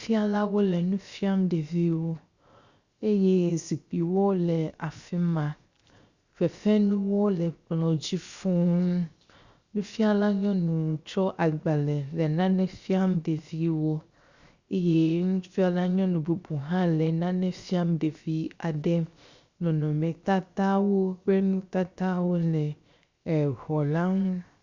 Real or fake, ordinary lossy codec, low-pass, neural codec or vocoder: fake; Opus, 64 kbps; 7.2 kHz; codec, 16 kHz, 0.7 kbps, FocalCodec